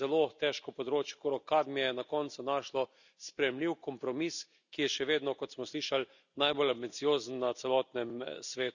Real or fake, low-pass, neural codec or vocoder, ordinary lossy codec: real; 7.2 kHz; none; none